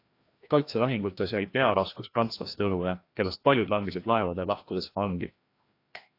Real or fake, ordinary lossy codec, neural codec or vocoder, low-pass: fake; AAC, 32 kbps; codec, 16 kHz, 1 kbps, FreqCodec, larger model; 5.4 kHz